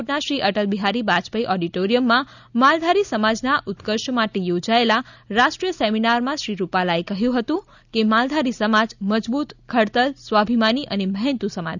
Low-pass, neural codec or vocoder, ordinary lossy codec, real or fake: 7.2 kHz; none; none; real